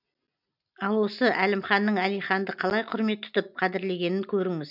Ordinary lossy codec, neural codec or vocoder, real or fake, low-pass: none; none; real; 5.4 kHz